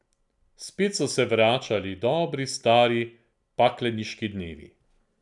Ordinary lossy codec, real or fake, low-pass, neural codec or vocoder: none; real; 10.8 kHz; none